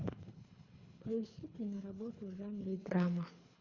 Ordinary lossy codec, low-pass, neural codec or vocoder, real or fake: MP3, 64 kbps; 7.2 kHz; codec, 24 kHz, 3 kbps, HILCodec; fake